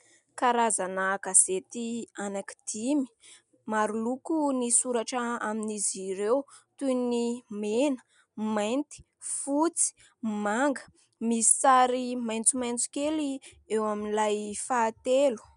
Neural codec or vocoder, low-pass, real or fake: none; 10.8 kHz; real